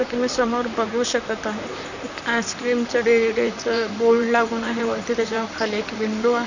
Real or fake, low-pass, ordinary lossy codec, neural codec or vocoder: fake; 7.2 kHz; none; vocoder, 44.1 kHz, 128 mel bands, Pupu-Vocoder